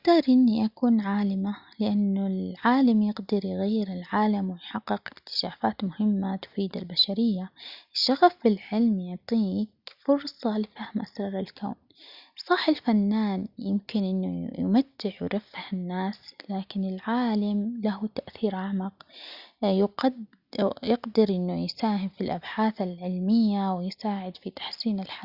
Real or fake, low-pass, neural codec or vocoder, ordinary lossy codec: real; 5.4 kHz; none; Opus, 64 kbps